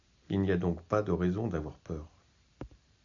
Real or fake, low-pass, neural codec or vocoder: real; 7.2 kHz; none